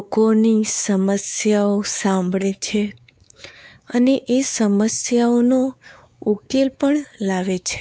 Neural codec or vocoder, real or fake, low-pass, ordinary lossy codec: codec, 16 kHz, 4 kbps, X-Codec, WavLM features, trained on Multilingual LibriSpeech; fake; none; none